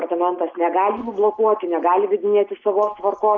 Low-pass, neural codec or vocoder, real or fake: 7.2 kHz; none; real